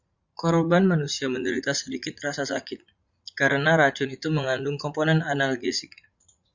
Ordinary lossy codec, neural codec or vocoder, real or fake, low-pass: Opus, 64 kbps; vocoder, 44.1 kHz, 80 mel bands, Vocos; fake; 7.2 kHz